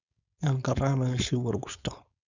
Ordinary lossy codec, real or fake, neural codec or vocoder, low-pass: none; fake; codec, 16 kHz, 4.8 kbps, FACodec; 7.2 kHz